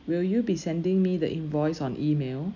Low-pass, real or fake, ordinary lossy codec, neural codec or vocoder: 7.2 kHz; real; none; none